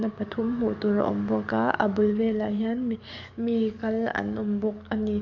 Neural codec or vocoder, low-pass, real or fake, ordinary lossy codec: codec, 44.1 kHz, 7.8 kbps, DAC; 7.2 kHz; fake; none